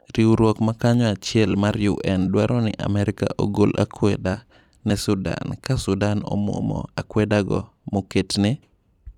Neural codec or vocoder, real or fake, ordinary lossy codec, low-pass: vocoder, 44.1 kHz, 128 mel bands every 256 samples, BigVGAN v2; fake; none; 19.8 kHz